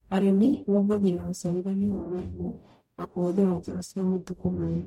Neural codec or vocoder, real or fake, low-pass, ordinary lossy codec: codec, 44.1 kHz, 0.9 kbps, DAC; fake; 19.8 kHz; MP3, 64 kbps